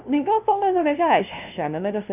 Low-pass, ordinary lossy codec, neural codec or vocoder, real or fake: 3.6 kHz; none; codec, 16 kHz, 0.5 kbps, FunCodec, trained on LibriTTS, 25 frames a second; fake